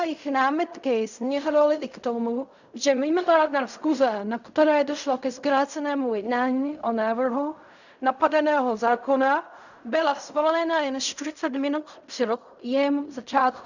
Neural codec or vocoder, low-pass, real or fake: codec, 16 kHz in and 24 kHz out, 0.4 kbps, LongCat-Audio-Codec, fine tuned four codebook decoder; 7.2 kHz; fake